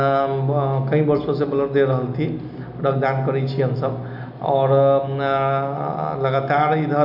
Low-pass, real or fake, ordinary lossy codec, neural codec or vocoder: 5.4 kHz; real; none; none